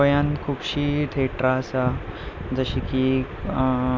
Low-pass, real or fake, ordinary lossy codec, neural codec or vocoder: none; real; none; none